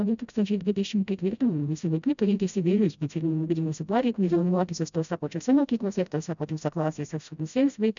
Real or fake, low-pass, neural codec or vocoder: fake; 7.2 kHz; codec, 16 kHz, 0.5 kbps, FreqCodec, smaller model